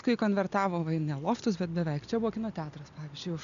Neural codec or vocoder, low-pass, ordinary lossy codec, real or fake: none; 7.2 kHz; MP3, 96 kbps; real